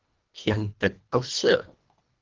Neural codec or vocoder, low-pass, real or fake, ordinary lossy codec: codec, 24 kHz, 1.5 kbps, HILCodec; 7.2 kHz; fake; Opus, 24 kbps